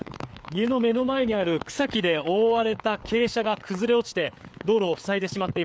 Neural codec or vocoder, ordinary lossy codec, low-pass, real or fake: codec, 16 kHz, 4 kbps, FreqCodec, larger model; none; none; fake